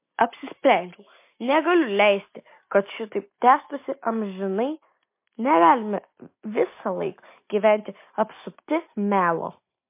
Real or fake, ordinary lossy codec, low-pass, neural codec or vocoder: real; MP3, 24 kbps; 3.6 kHz; none